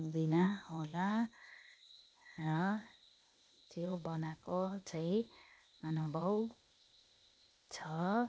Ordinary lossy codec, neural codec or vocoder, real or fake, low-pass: none; codec, 16 kHz, 0.8 kbps, ZipCodec; fake; none